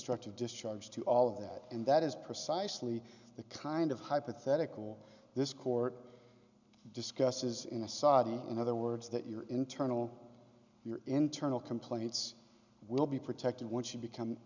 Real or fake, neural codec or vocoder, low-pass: real; none; 7.2 kHz